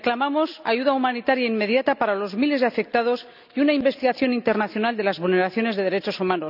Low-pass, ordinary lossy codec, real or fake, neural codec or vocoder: 5.4 kHz; none; real; none